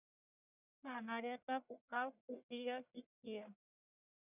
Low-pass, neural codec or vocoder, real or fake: 3.6 kHz; codec, 44.1 kHz, 1.7 kbps, Pupu-Codec; fake